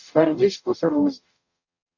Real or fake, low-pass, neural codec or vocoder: fake; 7.2 kHz; codec, 44.1 kHz, 0.9 kbps, DAC